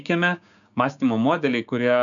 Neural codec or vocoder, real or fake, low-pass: codec, 16 kHz, 6 kbps, DAC; fake; 7.2 kHz